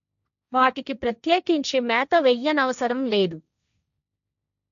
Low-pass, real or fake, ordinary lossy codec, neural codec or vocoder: 7.2 kHz; fake; none; codec, 16 kHz, 1.1 kbps, Voila-Tokenizer